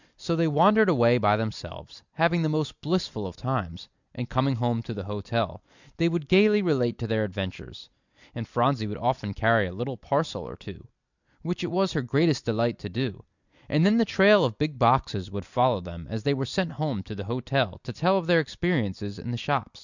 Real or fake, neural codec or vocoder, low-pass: real; none; 7.2 kHz